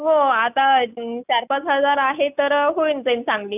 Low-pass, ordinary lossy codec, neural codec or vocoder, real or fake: 3.6 kHz; none; none; real